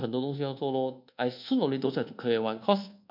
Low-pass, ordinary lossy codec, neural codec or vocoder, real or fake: 5.4 kHz; MP3, 48 kbps; codec, 24 kHz, 1.2 kbps, DualCodec; fake